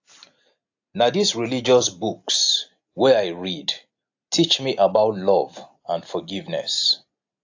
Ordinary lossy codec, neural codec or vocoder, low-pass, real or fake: AAC, 48 kbps; none; 7.2 kHz; real